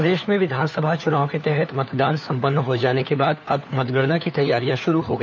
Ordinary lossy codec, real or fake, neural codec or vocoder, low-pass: none; fake; codec, 16 kHz, 4 kbps, FunCodec, trained on LibriTTS, 50 frames a second; none